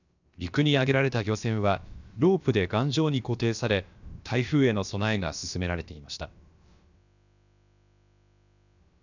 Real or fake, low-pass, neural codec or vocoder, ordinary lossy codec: fake; 7.2 kHz; codec, 16 kHz, about 1 kbps, DyCAST, with the encoder's durations; none